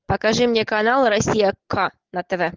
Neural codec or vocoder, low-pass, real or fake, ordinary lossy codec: none; 7.2 kHz; real; Opus, 16 kbps